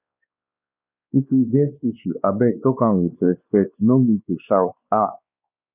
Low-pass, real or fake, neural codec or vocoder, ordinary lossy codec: 3.6 kHz; fake; codec, 16 kHz, 2 kbps, X-Codec, WavLM features, trained on Multilingual LibriSpeech; none